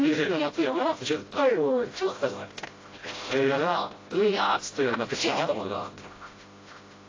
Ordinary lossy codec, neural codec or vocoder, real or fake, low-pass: AAC, 32 kbps; codec, 16 kHz, 0.5 kbps, FreqCodec, smaller model; fake; 7.2 kHz